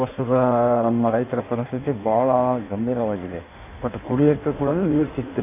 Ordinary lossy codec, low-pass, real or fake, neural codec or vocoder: AAC, 32 kbps; 3.6 kHz; fake; codec, 16 kHz in and 24 kHz out, 1.1 kbps, FireRedTTS-2 codec